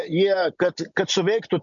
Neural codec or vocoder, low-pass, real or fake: none; 7.2 kHz; real